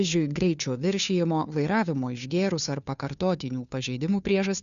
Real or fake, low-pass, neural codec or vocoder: fake; 7.2 kHz; codec, 16 kHz, 2 kbps, FunCodec, trained on Chinese and English, 25 frames a second